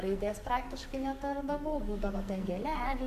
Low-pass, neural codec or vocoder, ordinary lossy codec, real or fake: 14.4 kHz; codec, 44.1 kHz, 2.6 kbps, SNAC; AAC, 96 kbps; fake